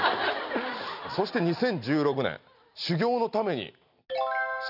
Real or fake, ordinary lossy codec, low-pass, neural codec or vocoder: real; none; 5.4 kHz; none